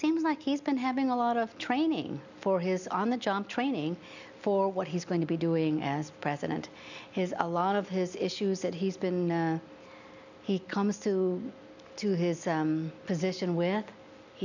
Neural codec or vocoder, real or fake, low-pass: none; real; 7.2 kHz